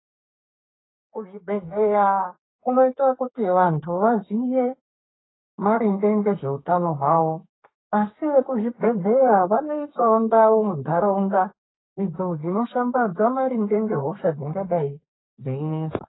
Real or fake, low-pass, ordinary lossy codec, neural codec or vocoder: fake; 7.2 kHz; AAC, 16 kbps; codec, 32 kHz, 1.9 kbps, SNAC